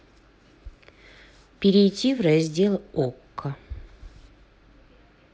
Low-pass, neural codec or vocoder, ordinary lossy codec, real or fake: none; none; none; real